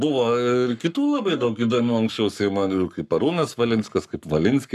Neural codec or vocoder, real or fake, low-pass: codec, 44.1 kHz, 7.8 kbps, Pupu-Codec; fake; 14.4 kHz